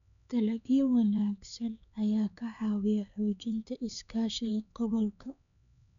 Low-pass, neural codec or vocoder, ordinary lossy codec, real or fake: 7.2 kHz; codec, 16 kHz, 4 kbps, X-Codec, HuBERT features, trained on LibriSpeech; none; fake